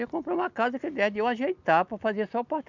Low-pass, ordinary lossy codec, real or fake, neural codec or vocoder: 7.2 kHz; none; real; none